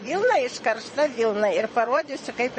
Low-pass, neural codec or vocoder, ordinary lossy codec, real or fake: 9.9 kHz; vocoder, 22.05 kHz, 80 mel bands, Vocos; MP3, 32 kbps; fake